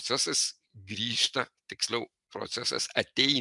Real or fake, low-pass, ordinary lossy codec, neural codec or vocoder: real; 10.8 kHz; MP3, 96 kbps; none